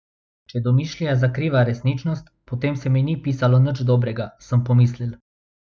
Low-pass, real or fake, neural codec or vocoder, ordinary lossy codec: none; real; none; none